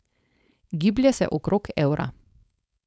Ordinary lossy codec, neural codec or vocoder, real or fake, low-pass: none; codec, 16 kHz, 4.8 kbps, FACodec; fake; none